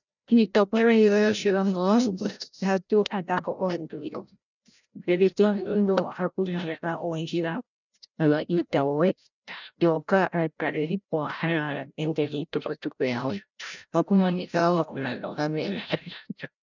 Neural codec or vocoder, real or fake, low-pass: codec, 16 kHz, 0.5 kbps, FreqCodec, larger model; fake; 7.2 kHz